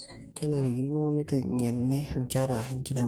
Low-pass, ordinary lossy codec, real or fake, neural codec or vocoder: none; none; fake; codec, 44.1 kHz, 2.6 kbps, DAC